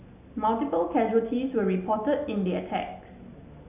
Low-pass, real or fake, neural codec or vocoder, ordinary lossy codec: 3.6 kHz; real; none; none